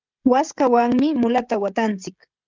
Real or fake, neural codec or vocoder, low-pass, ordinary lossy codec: fake; codec, 16 kHz, 16 kbps, FreqCodec, smaller model; 7.2 kHz; Opus, 24 kbps